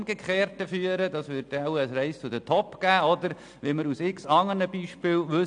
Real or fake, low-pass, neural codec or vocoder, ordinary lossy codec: real; 9.9 kHz; none; AAC, 64 kbps